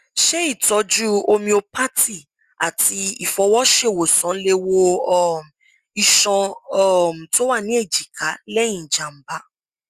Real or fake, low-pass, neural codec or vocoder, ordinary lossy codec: real; 14.4 kHz; none; Opus, 64 kbps